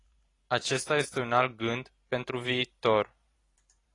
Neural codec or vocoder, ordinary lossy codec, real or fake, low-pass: vocoder, 44.1 kHz, 128 mel bands every 512 samples, BigVGAN v2; AAC, 32 kbps; fake; 10.8 kHz